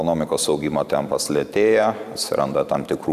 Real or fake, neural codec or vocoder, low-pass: fake; vocoder, 44.1 kHz, 128 mel bands every 512 samples, BigVGAN v2; 14.4 kHz